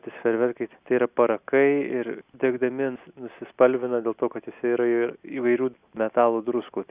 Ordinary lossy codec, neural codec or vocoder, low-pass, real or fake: Opus, 64 kbps; none; 3.6 kHz; real